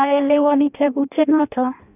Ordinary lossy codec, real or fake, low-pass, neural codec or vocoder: none; fake; 3.6 kHz; codec, 16 kHz in and 24 kHz out, 0.6 kbps, FireRedTTS-2 codec